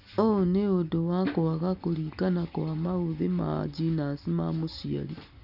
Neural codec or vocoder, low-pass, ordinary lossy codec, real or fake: none; 5.4 kHz; none; real